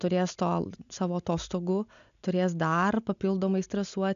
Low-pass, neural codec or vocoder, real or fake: 7.2 kHz; none; real